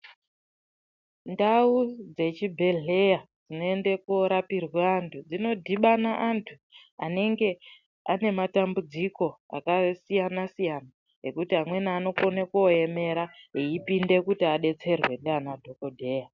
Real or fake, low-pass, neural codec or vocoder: real; 7.2 kHz; none